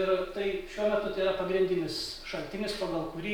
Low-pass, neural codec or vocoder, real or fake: 19.8 kHz; none; real